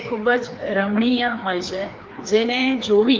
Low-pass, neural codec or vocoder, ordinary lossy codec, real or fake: 7.2 kHz; codec, 16 kHz, 2 kbps, FreqCodec, larger model; Opus, 24 kbps; fake